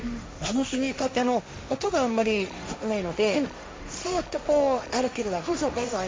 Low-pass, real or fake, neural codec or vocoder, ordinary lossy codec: none; fake; codec, 16 kHz, 1.1 kbps, Voila-Tokenizer; none